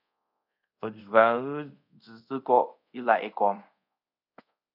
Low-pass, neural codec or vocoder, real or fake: 5.4 kHz; codec, 24 kHz, 0.5 kbps, DualCodec; fake